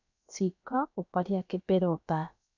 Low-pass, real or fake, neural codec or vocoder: 7.2 kHz; fake; codec, 16 kHz, about 1 kbps, DyCAST, with the encoder's durations